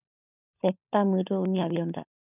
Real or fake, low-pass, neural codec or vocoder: fake; 3.6 kHz; codec, 16 kHz, 16 kbps, FunCodec, trained on LibriTTS, 50 frames a second